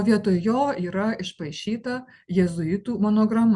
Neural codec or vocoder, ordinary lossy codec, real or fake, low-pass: none; Opus, 64 kbps; real; 10.8 kHz